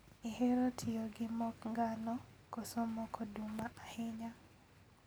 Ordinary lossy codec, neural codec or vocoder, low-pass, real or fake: none; none; none; real